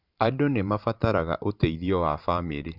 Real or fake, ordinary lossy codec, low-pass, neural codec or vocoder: fake; none; 5.4 kHz; vocoder, 44.1 kHz, 128 mel bands every 512 samples, BigVGAN v2